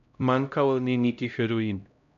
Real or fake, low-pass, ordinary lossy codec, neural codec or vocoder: fake; 7.2 kHz; none; codec, 16 kHz, 0.5 kbps, X-Codec, HuBERT features, trained on LibriSpeech